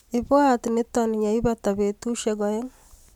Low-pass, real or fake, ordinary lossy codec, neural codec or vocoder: 19.8 kHz; real; MP3, 96 kbps; none